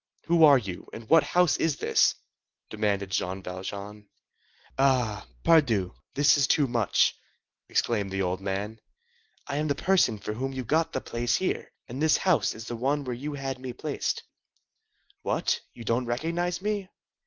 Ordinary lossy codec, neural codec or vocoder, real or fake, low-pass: Opus, 24 kbps; none; real; 7.2 kHz